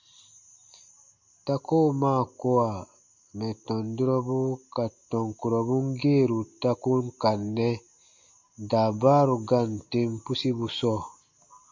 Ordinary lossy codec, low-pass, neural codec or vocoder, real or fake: MP3, 64 kbps; 7.2 kHz; none; real